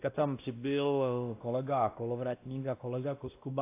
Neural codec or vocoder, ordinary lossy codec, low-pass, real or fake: codec, 16 kHz, 1 kbps, X-Codec, WavLM features, trained on Multilingual LibriSpeech; AAC, 24 kbps; 3.6 kHz; fake